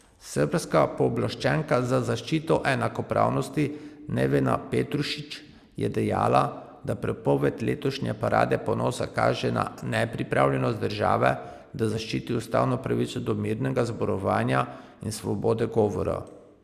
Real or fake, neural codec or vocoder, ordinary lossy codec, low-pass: real; none; Opus, 64 kbps; 14.4 kHz